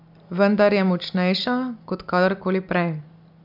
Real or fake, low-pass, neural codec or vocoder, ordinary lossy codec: real; 5.4 kHz; none; none